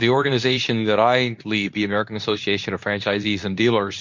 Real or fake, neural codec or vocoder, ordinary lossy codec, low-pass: fake; codec, 24 kHz, 0.9 kbps, WavTokenizer, medium speech release version 2; MP3, 48 kbps; 7.2 kHz